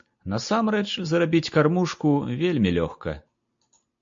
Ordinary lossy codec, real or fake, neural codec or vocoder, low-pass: MP3, 48 kbps; real; none; 7.2 kHz